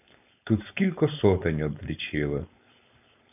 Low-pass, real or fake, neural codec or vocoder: 3.6 kHz; fake; codec, 16 kHz, 4.8 kbps, FACodec